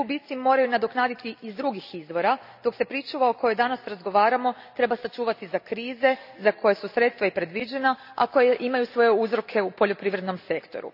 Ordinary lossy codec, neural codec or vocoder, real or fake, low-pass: none; none; real; 5.4 kHz